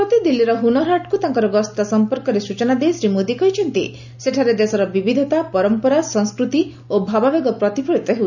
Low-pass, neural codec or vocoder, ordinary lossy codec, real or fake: 7.2 kHz; none; none; real